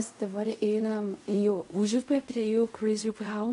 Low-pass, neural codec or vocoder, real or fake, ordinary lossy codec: 10.8 kHz; codec, 16 kHz in and 24 kHz out, 0.4 kbps, LongCat-Audio-Codec, fine tuned four codebook decoder; fake; AAC, 64 kbps